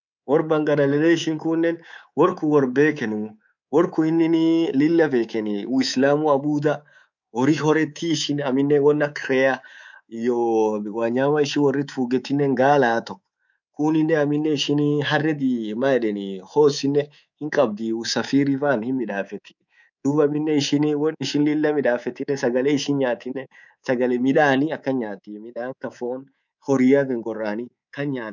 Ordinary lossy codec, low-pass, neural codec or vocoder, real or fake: none; 7.2 kHz; codec, 24 kHz, 3.1 kbps, DualCodec; fake